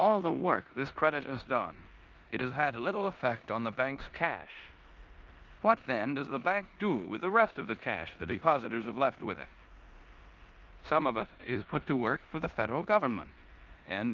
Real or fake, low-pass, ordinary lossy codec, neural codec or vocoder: fake; 7.2 kHz; Opus, 24 kbps; codec, 16 kHz in and 24 kHz out, 0.9 kbps, LongCat-Audio-Codec, four codebook decoder